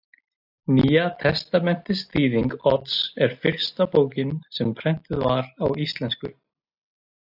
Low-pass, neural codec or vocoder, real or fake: 5.4 kHz; none; real